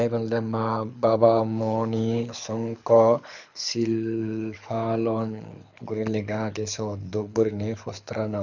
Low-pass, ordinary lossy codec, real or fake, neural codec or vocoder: 7.2 kHz; none; fake; codec, 24 kHz, 6 kbps, HILCodec